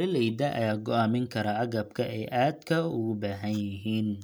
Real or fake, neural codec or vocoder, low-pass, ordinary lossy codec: real; none; none; none